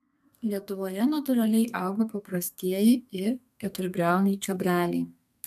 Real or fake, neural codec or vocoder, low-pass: fake; codec, 32 kHz, 1.9 kbps, SNAC; 14.4 kHz